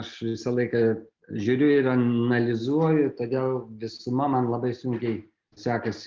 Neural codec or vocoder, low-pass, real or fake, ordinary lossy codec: none; 7.2 kHz; real; Opus, 32 kbps